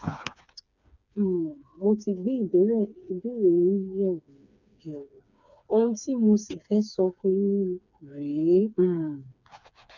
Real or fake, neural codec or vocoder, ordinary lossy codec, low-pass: fake; codec, 16 kHz, 4 kbps, FreqCodec, smaller model; none; 7.2 kHz